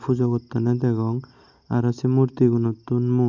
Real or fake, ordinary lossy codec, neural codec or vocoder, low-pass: real; none; none; 7.2 kHz